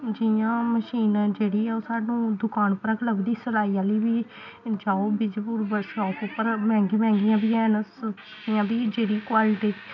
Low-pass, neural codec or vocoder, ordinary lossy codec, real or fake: 7.2 kHz; none; none; real